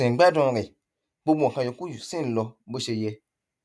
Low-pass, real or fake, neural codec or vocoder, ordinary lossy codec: none; real; none; none